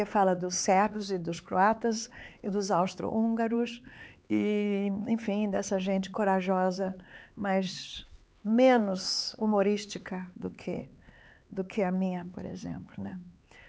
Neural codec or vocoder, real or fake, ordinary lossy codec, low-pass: codec, 16 kHz, 4 kbps, X-Codec, HuBERT features, trained on LibriSpeech; fake; none; none